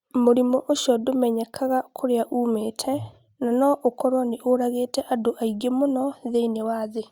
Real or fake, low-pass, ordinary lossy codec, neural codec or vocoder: real; 19.8 kHz; Opus, 64 kbps; none